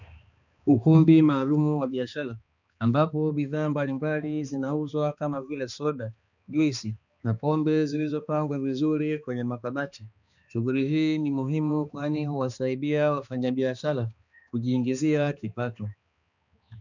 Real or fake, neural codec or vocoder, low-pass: fake; codec, 16 kHz, 2 kbps, X-Codec, HuBERT features, trained on balanced general audio; 7.2 kHz